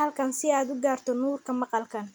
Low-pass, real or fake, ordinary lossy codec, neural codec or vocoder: none; real; none; none